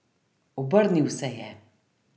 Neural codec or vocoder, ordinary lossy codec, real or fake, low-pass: none; none; real; none